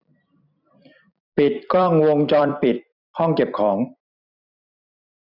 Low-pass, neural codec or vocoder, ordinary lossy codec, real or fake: 5.4 kHz; vocoder, 44.1 kHz, 128 mel bands every 256 samples, BigVGAN v2; none; fake